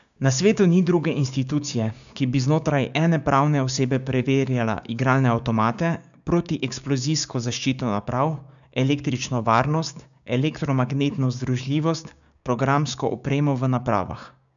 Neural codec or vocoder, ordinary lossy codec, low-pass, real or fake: codec, 16 kHz, 6 kbps, DAC; none; 7.2 kHz; fake